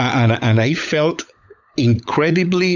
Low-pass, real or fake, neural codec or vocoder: 7.2 kHz; fake; codec, 16 kHz, 16 kbps, FunCodec, trained on LibriTTS, 50 frames a second